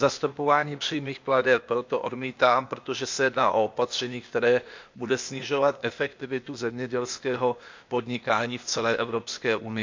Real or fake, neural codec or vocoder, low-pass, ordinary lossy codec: fake; codec, 16 kHz, 0.8 kbps, ZipCodec; 7.2 kHz; MP3, 64 kbps